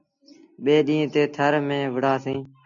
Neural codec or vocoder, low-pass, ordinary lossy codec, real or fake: none; 7.2 kHz; AAC, 64 kbps; real